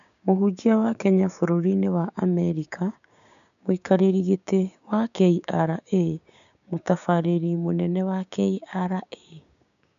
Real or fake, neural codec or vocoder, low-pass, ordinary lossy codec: fake; codec, 16 kHz, 6 kbps, DAC; 7.2 kHz; none